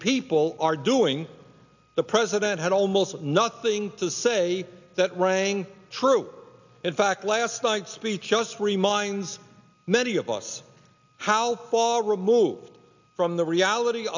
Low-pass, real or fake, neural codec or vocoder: 7.2 kHz; real; none